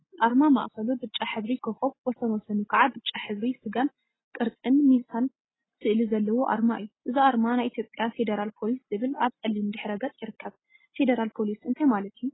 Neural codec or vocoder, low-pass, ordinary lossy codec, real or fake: none; 7.2 kHz; AAC, 16 kbps; real